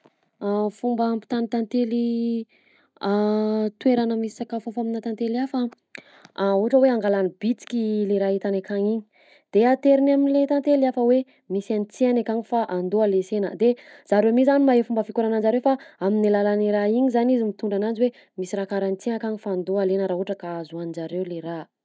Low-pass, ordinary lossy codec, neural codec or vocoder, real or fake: none; none; none; real